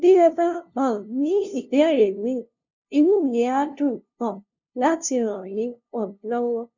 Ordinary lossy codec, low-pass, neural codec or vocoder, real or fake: none; 7.2 kHz; codec, 16 kHz, 0.5 kbps, FunCodec, trained on LibriTTS, 25 frames a second; fake